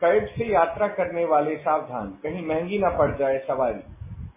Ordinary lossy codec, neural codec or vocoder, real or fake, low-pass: MP3, 16 kbps; none; real; 3.6 kHz